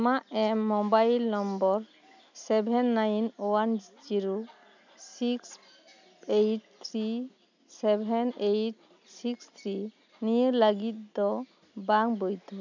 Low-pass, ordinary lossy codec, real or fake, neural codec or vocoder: 7.2 kHz; none; real; none